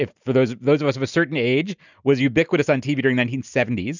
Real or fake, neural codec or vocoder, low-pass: real; none; 7.2 kHz